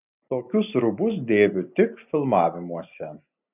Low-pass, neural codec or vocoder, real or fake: 3.6 kHz; none; real